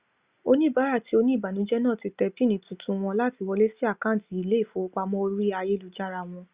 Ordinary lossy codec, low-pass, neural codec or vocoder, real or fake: Opus, 64 kbps; 3.6 kHz; none; real